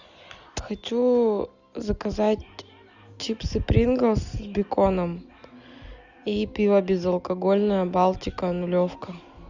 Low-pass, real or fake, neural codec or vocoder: 7.2 kHz; real; none